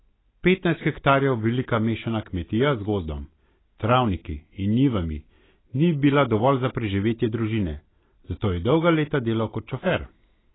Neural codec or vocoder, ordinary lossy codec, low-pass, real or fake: none; AAC, 16 kbps; 7.2 kHz; real